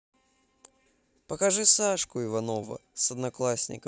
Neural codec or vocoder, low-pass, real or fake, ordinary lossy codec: none; none; real; none